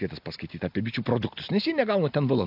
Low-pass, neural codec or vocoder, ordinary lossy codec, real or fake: 5.4 kHz; none; AAC, 48 kbps; real